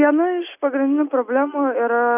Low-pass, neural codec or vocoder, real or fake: 3.6 kHz; none; real